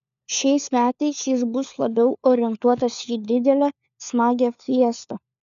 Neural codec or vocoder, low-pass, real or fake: codec, 16 kHz, 4 kbps, FunCodec, trained on LibriTTS, 50 frames a second; 7.2 kHz; fake